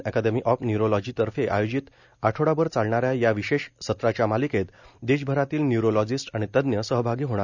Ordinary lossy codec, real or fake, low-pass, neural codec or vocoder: none; real; 7.2 kHz; none